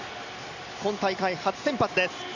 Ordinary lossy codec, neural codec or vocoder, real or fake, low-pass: none; none; real; 7.2 kHz